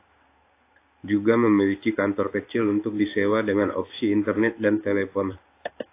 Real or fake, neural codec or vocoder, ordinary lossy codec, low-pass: fake; codec, 16 kHz in and 24 kHz out, 1 kbps, XY-Tokenizer; AAC, 24 kbps; 3.6 kHz